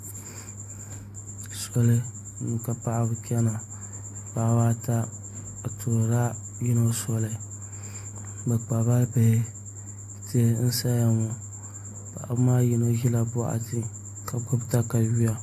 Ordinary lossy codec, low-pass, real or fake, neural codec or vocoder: AAC, 64 kbps; 14.4 kHz; real; none